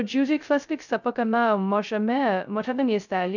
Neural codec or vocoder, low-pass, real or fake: codec, 16 kHz, 0.2 kbps, FocalCodec; 7.2 kHz; fake